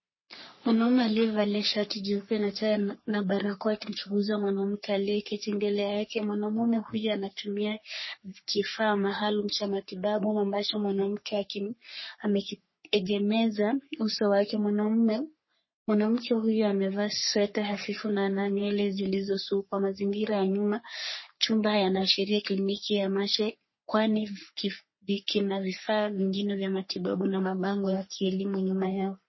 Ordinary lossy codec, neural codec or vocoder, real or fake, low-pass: MP3, 24 kbps; codec, 44.1 kHz, 3.4 kbps, Pupu-Codec; fake; 7.2 kHz